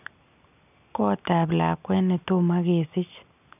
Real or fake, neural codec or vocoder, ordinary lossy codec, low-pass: real; none; none; 3.6 kHz